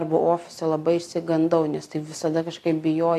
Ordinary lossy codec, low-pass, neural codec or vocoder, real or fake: AAC, 64 kbps; 14.4 kHz; none; real